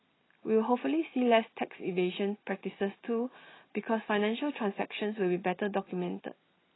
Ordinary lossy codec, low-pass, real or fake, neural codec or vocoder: AAC, 16 kbps; 7.2 kHz; real; none